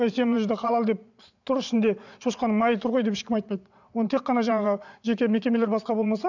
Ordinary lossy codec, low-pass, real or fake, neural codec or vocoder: none; 7.2 kHz; fake; vocoder, 22.05 kHz, 80 mel bands, Vocos